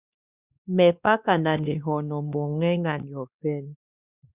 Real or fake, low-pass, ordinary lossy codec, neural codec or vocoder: fake; 3.6 kHz; Opus, 64 kbps; codec, 16 kHz, 2 kbps, X-Codec, WavLM features, trained on Multilingual LibriSpeech